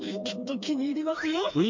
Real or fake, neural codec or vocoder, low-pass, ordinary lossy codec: fake; codec, 16 kHz, 2 kbps, FreqCodec, smaller model; 7.2 kHz; MP3, 48 kbps